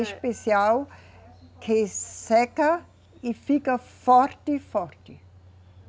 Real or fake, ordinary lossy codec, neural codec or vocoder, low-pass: real; none; none; none